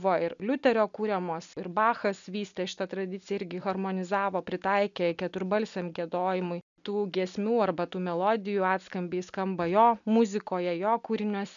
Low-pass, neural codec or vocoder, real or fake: 7.2 kHz; none; real